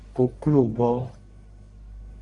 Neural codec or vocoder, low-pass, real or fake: codec, 44.1 kHz, 1.7 kbps, Pupu-Codec; 10.8 kHz; fake